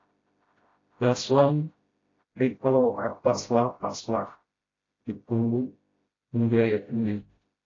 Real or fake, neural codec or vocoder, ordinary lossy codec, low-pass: fake; codec, 16 kHz, 0.5 kbps, FreqCodec, smaller model; AAC, 32 kbps; 7.2 kHz